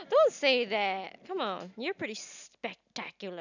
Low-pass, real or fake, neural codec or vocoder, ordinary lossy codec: 7.2 kHz; real; none; none